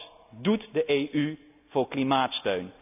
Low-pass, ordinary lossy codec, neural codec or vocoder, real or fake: 3.6 kHz; none; none; real